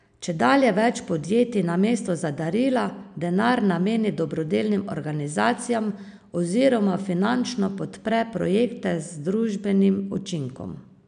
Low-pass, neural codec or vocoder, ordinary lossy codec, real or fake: 9.9 kHz; none; none; real